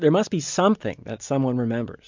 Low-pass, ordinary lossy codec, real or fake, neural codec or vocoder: 7.2 kHz; MP3, 64 kbps; real; none